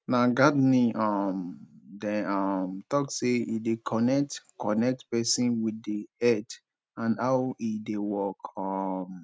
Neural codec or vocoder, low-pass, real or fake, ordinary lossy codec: none; none; real; none